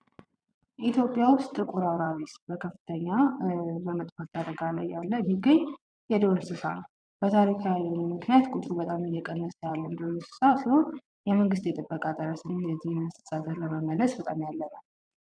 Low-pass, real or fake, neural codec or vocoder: 9.9 kHz; fake; vocoder, 22.05 kHz, 80 mel bands, Vocos